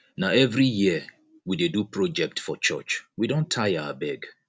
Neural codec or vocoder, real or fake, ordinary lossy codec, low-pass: none; real; none; none